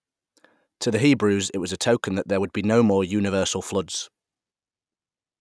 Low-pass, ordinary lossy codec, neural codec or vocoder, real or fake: none; none; none; real